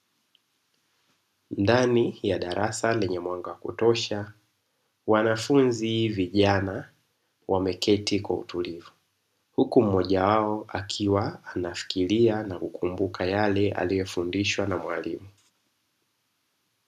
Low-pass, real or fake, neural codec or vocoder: 14.4 kHz; real; none